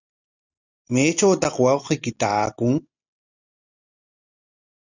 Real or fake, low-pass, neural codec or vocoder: real; 7.2 kHz; none